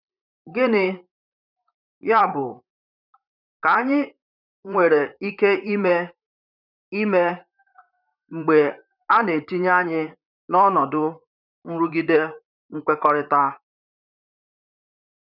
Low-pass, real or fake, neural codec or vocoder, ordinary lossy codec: 5.4 kHz; fake; vocoder, 44.1 kHz, 128 mel bands, Pupu-Vocoder; none